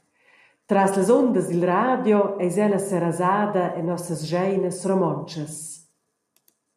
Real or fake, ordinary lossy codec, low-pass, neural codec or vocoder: real; MP3, 96 kbps; 14.4 kHz; none